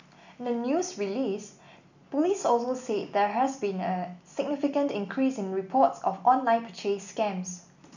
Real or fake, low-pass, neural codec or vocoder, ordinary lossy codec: real; 7.2 kHz; none; none